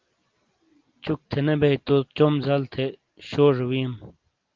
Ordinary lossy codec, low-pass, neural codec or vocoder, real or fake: Opus, 32 kbps; 7.2 kHz; none; real